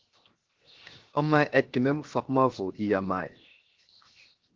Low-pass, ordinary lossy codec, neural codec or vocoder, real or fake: 7.2 kHz; Opus, 16 kbps; codec, 16 kHz, 0.7 kbps, FocalCodec; fake